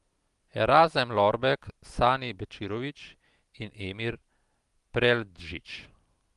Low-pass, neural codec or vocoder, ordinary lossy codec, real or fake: 10.8 kHz; none; Opus, 24 kbps; real